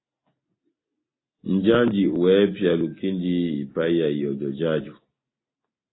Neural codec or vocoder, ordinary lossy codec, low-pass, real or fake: none; AAC, 16 kbps; 7.2 kHz; real